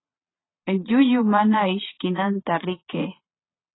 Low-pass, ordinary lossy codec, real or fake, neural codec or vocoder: 7.2 kHz; AAC, 16 kbps; fake; vocoder, 22.05 kHz, 80 mel bands, Vocos